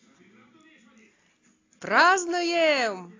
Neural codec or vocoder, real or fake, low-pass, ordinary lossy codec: none; real; 7.2 kHz; AAC, 32 kbps